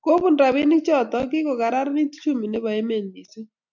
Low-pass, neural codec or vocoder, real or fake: 7.2 kHz; none; real